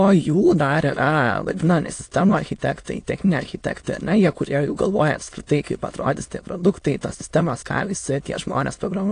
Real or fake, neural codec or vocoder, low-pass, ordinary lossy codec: fake; autoencoder, 22.05 kHz, a latent of 192 numbers a frame, VITS, trained on many speakers; 9.9 kHz; AAC, 48 kbps